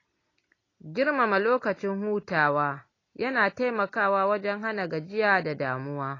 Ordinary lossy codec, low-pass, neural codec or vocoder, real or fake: AAC, 32 kbps; 7.2 kHz; none; real